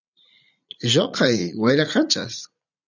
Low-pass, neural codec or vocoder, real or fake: 7.2 kHz; vocoder, 44.1 kHz, 80 mel bands, Vocos; fake